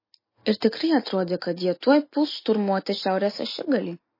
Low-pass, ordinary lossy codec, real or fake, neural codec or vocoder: 5.4 kHz; MP3, 24 kbps; real; none